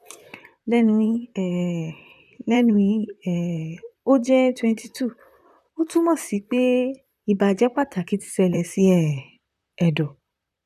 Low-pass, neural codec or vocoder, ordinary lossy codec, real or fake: 14.4 kHz; vocoder, 44.1 kHz, 128 mel bands, Pupu-Vocoder; none; fake